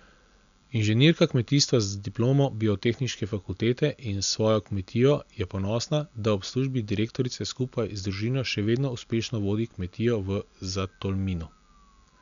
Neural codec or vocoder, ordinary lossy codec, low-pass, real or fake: none; none; 7.2 kHz; real